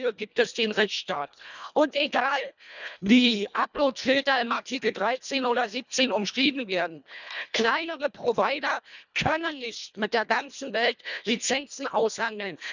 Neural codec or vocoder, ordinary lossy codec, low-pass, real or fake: codec, 24 kHz, 1.5 kbps, HILCodec; none; 7.2 kHz; fake